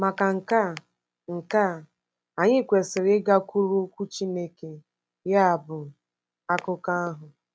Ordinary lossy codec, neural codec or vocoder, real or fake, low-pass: none; none; real; none